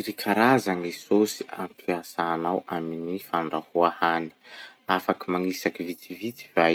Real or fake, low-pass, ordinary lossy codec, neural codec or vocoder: real; 19.8 kHz; none; none